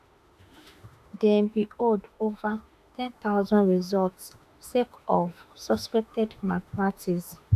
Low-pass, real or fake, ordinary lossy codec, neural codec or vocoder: 14.4 kHz; fake; none; autoencoder, 48 kHz, 32 numbers a frame, DAC-VAE, trained on Japanese speech